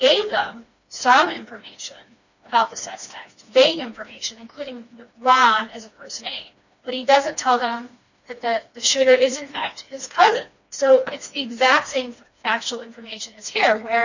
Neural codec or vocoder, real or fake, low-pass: codec, 16 kHz, 2 kbps, FreqCodec, smaller model; fake; 7.2 kHz